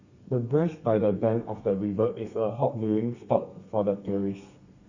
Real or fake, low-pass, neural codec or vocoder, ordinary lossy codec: fake; 7.2 kHz; codec, 32 kHz, 1.9 kbps, SNAC; Opus, 64 kbps